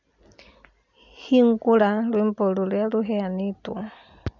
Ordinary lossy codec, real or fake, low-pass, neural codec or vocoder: none; real; 7.2 kHz; none